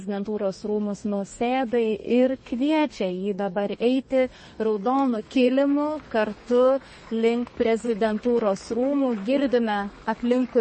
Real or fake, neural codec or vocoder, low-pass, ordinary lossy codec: fake; codec, 32 kHz, 1.9 kbps, SNAC; 10.8 kHz; MP3, 32 kbps